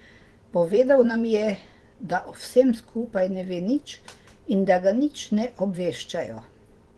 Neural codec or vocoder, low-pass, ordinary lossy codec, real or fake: vocoder, 24 kHz, 100 mel bands, Vocos; 10.8 kHz; Opus, 16 kbps; fake